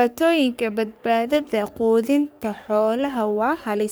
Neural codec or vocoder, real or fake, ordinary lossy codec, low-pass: codec, 44.1 kHz, 3.4 kbps, Pupu-Codec; fake; none; none